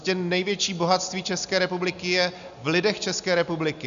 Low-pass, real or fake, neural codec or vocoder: 7.2 kHz; real; none